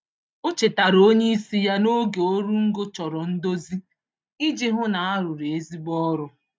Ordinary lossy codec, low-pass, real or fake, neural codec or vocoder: none; none; real; none